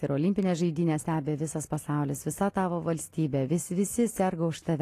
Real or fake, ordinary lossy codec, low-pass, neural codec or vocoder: real; AAC, 48 kbps; 14.4 kHz; none